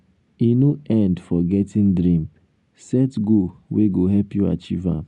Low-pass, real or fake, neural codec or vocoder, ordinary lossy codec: 10.8 kHz; real; none; none